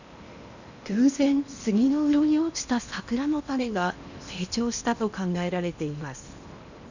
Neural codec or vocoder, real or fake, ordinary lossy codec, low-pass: codec, 16 kHz in and 24 kHz out, 0.8 kbps, FocalCodec, streaming, 65536 codes; fake; none; 7.2 kHz